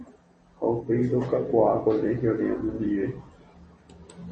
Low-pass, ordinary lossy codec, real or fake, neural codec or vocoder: 10.8 kHz; MP3, 32 kbps; real; none